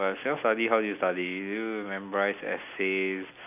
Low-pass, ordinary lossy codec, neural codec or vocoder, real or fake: 3.6 kHz; none; none; real